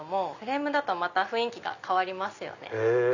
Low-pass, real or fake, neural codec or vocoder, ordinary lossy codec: 7.2 kHz; real; none; none